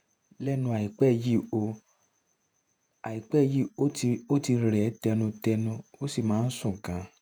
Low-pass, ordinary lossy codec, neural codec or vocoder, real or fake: none; none; vocoder, 48 kHz, 128 mel bands, Vocos; fake